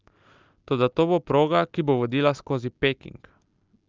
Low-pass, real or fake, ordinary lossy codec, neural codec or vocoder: 7.2 kHz; real; Opus, 32 kbps; none